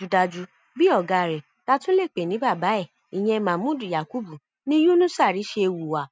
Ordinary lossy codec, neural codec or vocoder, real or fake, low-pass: none; none; real; none